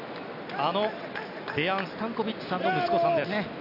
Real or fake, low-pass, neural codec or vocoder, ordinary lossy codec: real; 5.4 kHz; none; none